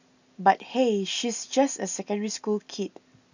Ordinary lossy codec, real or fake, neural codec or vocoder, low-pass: none; real; none; 7.2 kHz